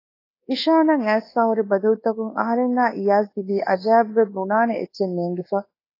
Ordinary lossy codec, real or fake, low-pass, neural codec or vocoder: AAC, 24 kbps; fake; 5.4 kHz; codec, 24 kHz, 1.2 kbps, DualCodec